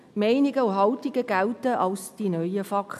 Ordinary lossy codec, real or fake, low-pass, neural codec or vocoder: none; real; 14.4 kHz; none